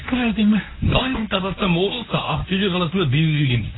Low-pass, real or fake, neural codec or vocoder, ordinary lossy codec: 7.2 kHz; fake; codec, 24 kHz, 0.9 kbps, WavTokenizer, medium speech release version 1; AAC, 16 kbps